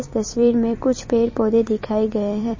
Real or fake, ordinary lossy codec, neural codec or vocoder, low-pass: fake; MP3, 32 kbps; vocoder, 44.1 kHz, 128 mel bands every 256 samples, BigVGAN v2; 7.2 kHz